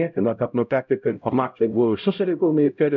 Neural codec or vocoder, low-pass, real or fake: codec, 16 kHz, 0.5 kbps, X-Codec, HuBERT features, trained on LibriSpeech; 7.2 kHz; fake